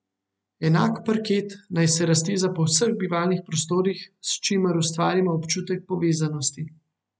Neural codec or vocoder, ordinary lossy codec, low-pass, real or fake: none; none; none; real